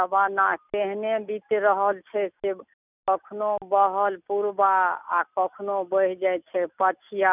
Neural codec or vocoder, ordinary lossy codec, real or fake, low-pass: none; none; real; 3.6 kHz